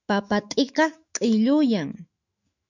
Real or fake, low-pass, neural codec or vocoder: fake; 7.2 kHz; autoencoder, 48 kHz, 128 numbers a frame, DAC-VAE, trained on Japanese speech